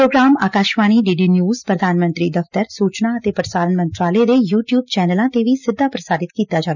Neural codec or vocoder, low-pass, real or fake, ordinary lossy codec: none; 7.2 kHz; real; none